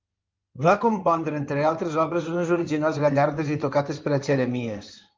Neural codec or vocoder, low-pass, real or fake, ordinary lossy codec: codec, 16 kHz in and 24 kHz out, 2.2 kbps, FireRedTTS-2 codec; 7.2 kHz; fake; Opus, 32 kbps